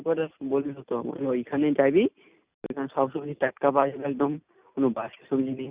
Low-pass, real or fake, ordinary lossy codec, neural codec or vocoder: 3.6 kHz; real; Opus, 64 kbps; none